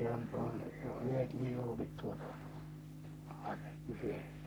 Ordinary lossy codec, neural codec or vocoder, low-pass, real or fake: none; codec, 44.1 kHz, 3.4 kbps, Pupu-Codec; none; fake